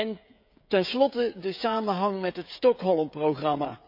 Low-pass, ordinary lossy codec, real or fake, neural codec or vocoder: 5.4 kHz; none; fake; codec, 16 kHz, 16 kbps, FreqCodec, smaller model